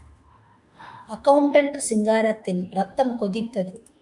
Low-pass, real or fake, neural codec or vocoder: 10.8 kHz; fake; autoencoder, 48 kHz, 32 numbers a frame, DAC-VAE, trained on Japanese speech